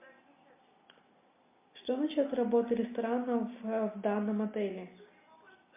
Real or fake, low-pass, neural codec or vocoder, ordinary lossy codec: real; 3.6 kHz; none; MP3, 32 kbps